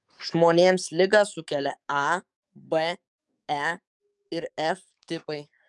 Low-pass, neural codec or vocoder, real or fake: 10.8 kHz; codec, 44.1 kHz, 7.8 kbps, DAC; fake